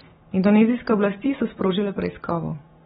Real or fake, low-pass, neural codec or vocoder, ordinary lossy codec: real; 7.2 kHz; none; AAC, 16 kbps